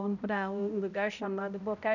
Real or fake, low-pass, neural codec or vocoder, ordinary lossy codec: fake; 7.2 kHz; codec, 16 kHz, 0.5 kbps, X-Codec, HuBERT features, trained on balanced general audio; none